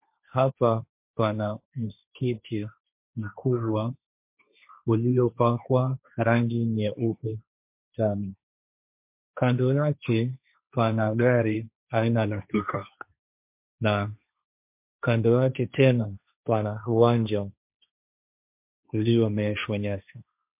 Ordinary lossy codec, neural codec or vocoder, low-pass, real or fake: MP3, 32 kbps; codec, 16 kHz, 1.1 kbps, Voila-Tokenizer; 3.6 kHz; fake